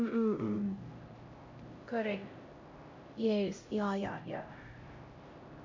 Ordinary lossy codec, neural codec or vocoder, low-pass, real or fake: MP3, 48 kbps; codec, 16 kHz, 0.5 kbps, X-Codec, HuBERT features, trained on LibriSpeech; 7.2 kHz; fake